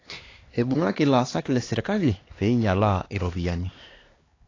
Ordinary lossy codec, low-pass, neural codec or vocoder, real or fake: AAC, 32 kbps; 7.2 kHz; codec, 16 kHz, 2 kbps, X-Codec, HuBERT features, trained on LibriSpeech; fake